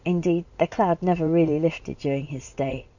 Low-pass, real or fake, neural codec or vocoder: 7.2 kHz; fake; vocoder, 44.1 kHz, 80 mel bands, Vocos